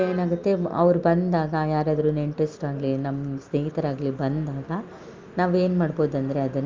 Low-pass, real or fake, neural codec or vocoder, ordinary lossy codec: 7.2 kHz; real; none; Opus, 24 kbps